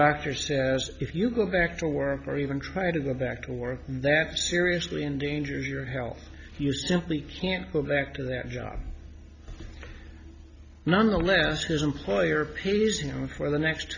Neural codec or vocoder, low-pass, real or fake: none; 7.2 kHz; real